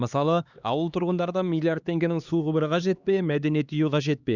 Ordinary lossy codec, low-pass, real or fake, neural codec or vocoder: Opus, 64 kbps; 7.2 kHz; fake; codec, 16 kHz, 2 kbps, X-Codec, HuBERT features, trained on LibriSpeech